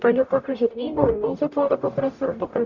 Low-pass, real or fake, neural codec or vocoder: 7.2 kHz; fake; codec, 44.1 kHz, 0.9 kbps, DAC